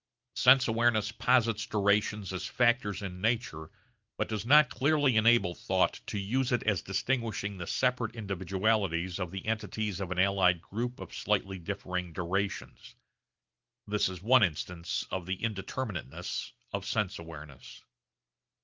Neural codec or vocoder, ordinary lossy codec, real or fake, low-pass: none; Opus, 32 kbps; real; 7.2 kHz